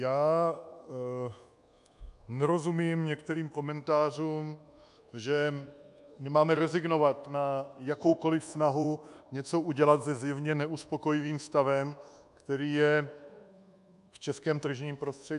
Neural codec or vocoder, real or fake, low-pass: codec, 24 kHz, 1.2 kbps, DualCodec; fake; 10.8 kHz